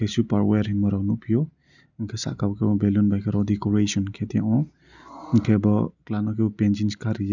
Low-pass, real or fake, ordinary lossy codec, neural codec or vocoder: 7.2 kHz; real; none; none